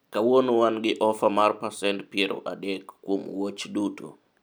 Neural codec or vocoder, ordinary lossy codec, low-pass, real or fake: none; none; none; real